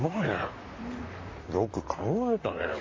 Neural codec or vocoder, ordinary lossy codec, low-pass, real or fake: vocoder, 44.1 kHz, 128 mel bands, Pupu-Vocoder; MP3, 32 kbps; 7.2 kHz; fake